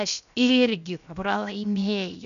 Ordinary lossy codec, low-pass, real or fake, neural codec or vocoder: MP3, 96 kbps; 7.2 kHz; fake; codec, 16 kHz, 0.7 kbps, FocalCodec